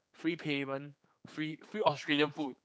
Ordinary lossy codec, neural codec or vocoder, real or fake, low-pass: none; codec, 16 kHz, 4 kbps, X-Codec, HuBERT features, trained on general audio; fake; none